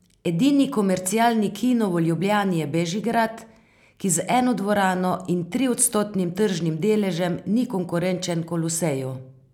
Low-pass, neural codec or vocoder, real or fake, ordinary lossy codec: 19.8 kHz; none; real; none